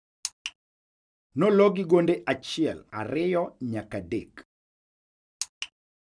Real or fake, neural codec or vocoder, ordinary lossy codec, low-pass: real; none; none; 9.9 kHz